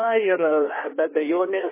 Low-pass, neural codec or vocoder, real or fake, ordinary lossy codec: 3.6 kHz; codec, 16 kHz in and 24 kHz out, 1.1 kbps, FireRedTTS-2 codec; fake; MP3, 16 kbps